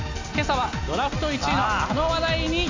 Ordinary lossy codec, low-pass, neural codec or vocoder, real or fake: AAC, 48 kbps; 7.2 kHz; none; real